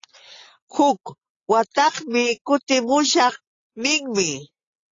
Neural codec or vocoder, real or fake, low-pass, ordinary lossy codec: none; real; 7.2 kHz; AAC, 32 kbps